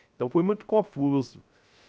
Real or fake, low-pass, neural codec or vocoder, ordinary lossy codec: fake; none; codec, 16 kHz, 0.3 kbps, FocalCodec; none